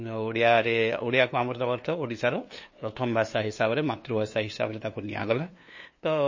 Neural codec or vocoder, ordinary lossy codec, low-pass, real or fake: codec, 16 kHz, 2 kbps, FunCodec, trained on LibriTTS, 25 frames a second; MP3, 32 kbps; 7.2 kHz; fake